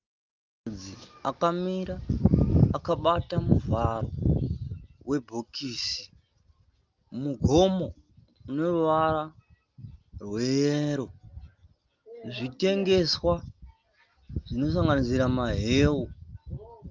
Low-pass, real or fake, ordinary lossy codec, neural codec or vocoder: 7.2 kHz; real; Opus, 32 kbps; none